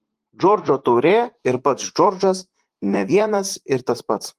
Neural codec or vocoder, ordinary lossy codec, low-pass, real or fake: vocoder, 44.1 kHz, 128 mel bands, Pupu-Vocoder; Opus, 24 kbps; 14.4 kHz; fake